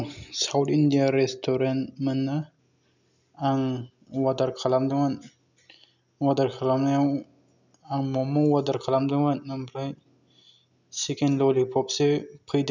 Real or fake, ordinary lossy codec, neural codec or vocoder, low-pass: real; none; none; 7.2 kHz